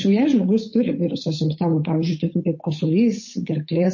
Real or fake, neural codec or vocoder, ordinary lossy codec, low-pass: fake; codec, 16 kHz, 8 kbps, FunCodec, trained on Chinese and English, 25 frames a second; MP3, 32 kbps; 7.2 kHz